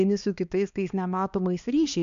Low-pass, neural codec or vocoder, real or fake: 7.2 kHz; codec, 16 kHz, 2 kbps, X-Codec, HuBERT features, trained on balanced general audio; fake